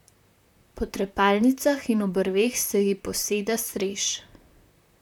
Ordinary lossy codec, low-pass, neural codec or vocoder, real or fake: none; 19.8 kHz; vocoder, 44.1 kHz, 128 mel bands, Pupu-Vocoder; fake